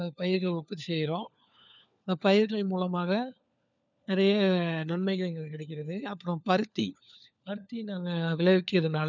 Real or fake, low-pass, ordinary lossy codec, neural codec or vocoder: fake; 7.2 kHz; none; codec, 16 kHz, 4 kbps, FunCodec, trained on LibriTTS, 50 frames a second